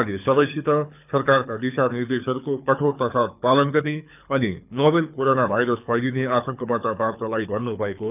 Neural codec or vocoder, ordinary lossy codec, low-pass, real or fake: codec, 24 kHz, 6 kbps, HILCodec; none; 3.6 kHz; fake